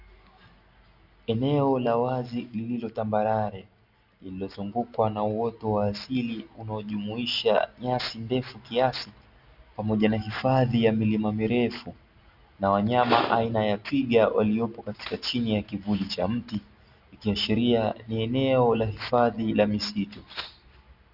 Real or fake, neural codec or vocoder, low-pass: real; none; 5.4 kHz